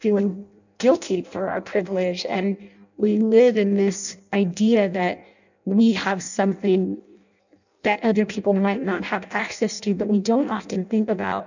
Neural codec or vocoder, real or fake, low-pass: codec, 16 kHz in and 24 kHz out, 0.6 kbps, FireRedTTS-2 codec; fake; 7.2 kHz